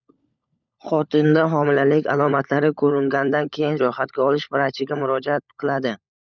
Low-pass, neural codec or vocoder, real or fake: 7.2 kHz; codec, 16 kHz, 16 kbps, FunCodec, trained on LibriTTS, 50 frames a second; fake